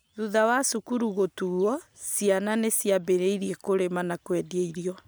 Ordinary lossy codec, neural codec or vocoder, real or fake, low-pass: none; none; real; none